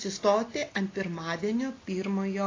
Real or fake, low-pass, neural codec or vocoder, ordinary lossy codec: real; 7.2 kHz; none; AAC, 32 kbps